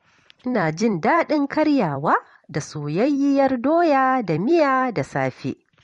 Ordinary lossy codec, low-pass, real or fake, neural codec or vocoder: MP3, 48 kbps; 19.8 kHz; fake; vocoder, 44.1 kHz, 128 mel bands every 256 samples, BigVGAN v2